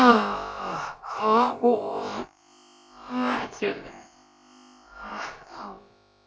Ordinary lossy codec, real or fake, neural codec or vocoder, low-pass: none; fake; codec, 16 kHz, about 1 kbps, DyCAST, with the encoder's durations; none